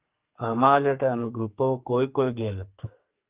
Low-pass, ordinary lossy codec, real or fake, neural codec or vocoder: 3.6 kHz; Opus, 24 kbps; fake; codec, 44.1 kHz, 2.6 kbps, SNAC